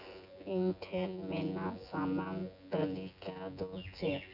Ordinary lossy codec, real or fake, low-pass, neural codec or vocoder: none; fake; 5.4 kHz; vocoder, 24 kHz, 100 mel bands, Vocos